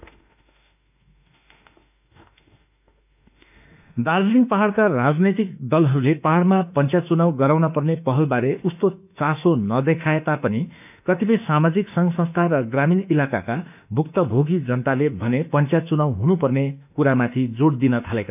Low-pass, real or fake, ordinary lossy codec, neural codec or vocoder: 3.6 kHz; fake; none; autoencoder, 48 kHz, 32 numbers a frame, DAC-VAE, trained on Japanese speech